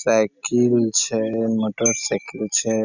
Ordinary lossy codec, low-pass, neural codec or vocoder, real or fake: none; 7.2 kHz; none; real